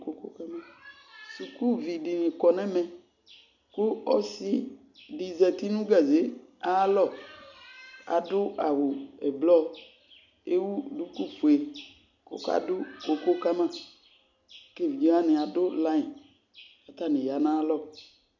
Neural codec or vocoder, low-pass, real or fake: none; 7.2 kHz; real